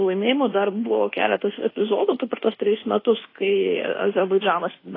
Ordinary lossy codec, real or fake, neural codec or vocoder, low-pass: AAC, 24 kbps; fake; codec, 24 kHz, 1.2 kbps, DualCodec; 5.4 kHz